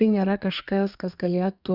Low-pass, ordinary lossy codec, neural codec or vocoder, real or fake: 5.4 kHz; Opus, 64 kbps; codec, 32 kHz, 1.9 kbps, SNAC; fake